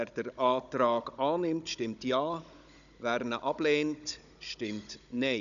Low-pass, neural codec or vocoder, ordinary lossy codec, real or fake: 7.2 kHz; codec, 16 kHz, 16 kbps, FunCodec, trained on Chinese and English, 50 frames a second; none; fake